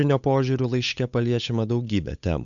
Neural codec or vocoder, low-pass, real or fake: codec, 16 kHz, 8 kbps, FunCodec, trained on Chinese and English, 25 frames a second; 7.2 kHz; fake